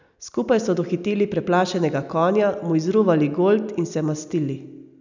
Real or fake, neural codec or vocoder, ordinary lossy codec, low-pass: real; none; none; 7.2 kHz